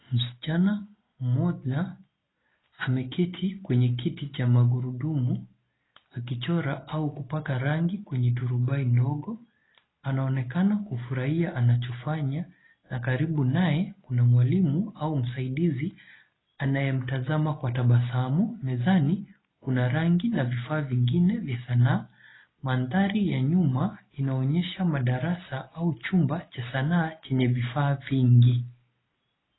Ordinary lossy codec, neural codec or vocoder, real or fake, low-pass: AAC, 16 kbps; none; real; 7.2 kHz